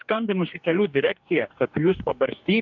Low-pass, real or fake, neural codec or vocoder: 7.2 kHz; fake; codec, 44.1 kHz, 2.6 kbps, DAC